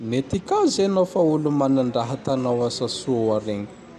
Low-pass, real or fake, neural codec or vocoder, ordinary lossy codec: 14.4 kHz; real; none; none